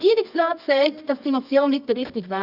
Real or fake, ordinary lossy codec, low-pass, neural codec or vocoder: fake; AAC, 48 kbps; 5.4 kHz; codec, 24 kHz, 0.9 kbps, WavTokenizer, medium music audio release